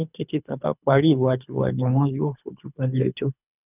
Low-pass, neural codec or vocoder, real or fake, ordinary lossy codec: 3.6 kHz; codec, 24 kHz, 3 kbps, HILCodec; fake; none